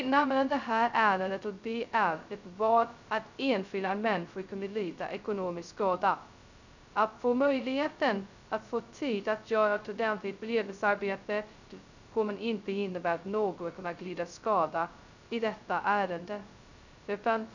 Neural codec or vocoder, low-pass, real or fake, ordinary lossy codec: codec, 16 kHz, 0.2 kbps, FocalCodec; 7.2 kHz; fake; none